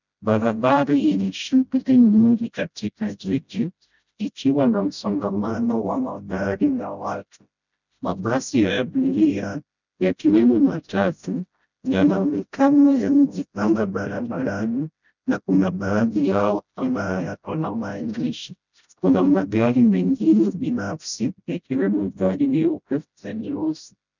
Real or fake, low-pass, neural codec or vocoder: fake; 7.2 kHz; codec, 16 kHz, 0.5 kbps, FreqCodec, smaller model